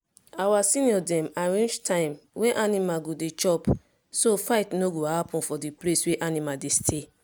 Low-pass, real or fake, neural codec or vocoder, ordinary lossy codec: none; real; none; none